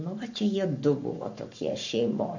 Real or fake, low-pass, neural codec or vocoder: fake; 7.2 kHz; codec, 44.1 kHz, 7.8 kbps, Pupu-Codec